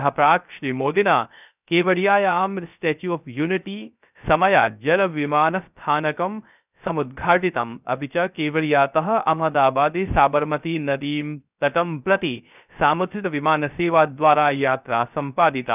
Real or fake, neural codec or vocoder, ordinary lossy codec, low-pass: fake; codec, 16 kHz, 0.3 kbps, FocalCodec; none; 3.6 kHz